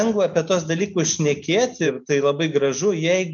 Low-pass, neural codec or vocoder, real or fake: 7.2 kHz; none; real